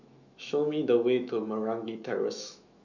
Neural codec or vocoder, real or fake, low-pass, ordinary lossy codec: autoencoder, 48 kHz, 128 numbers a frame, DAC-VAE, trained on Japanese speech; fake; 7.2 kHz; none